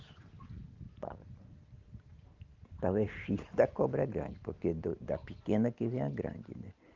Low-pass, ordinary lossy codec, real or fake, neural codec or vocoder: 7.2 kHz; Opus, 32 kbps; real; none